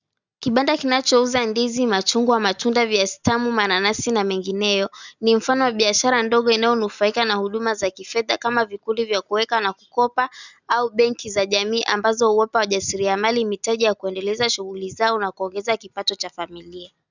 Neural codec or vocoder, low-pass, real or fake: none; 7.2 kHz; real